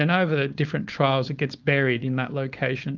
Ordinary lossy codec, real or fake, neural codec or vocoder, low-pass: Opus, 24 kbps; fake; codec, 16 kHz, 4.8 kbps, FACodec; 7.2 kHz